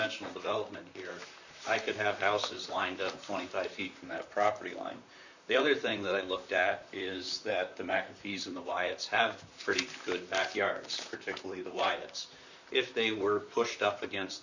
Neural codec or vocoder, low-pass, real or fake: vocoder, 44.1 kHz, 128 mel bands, Pupu-Vocoder; 7.2 kHz; fake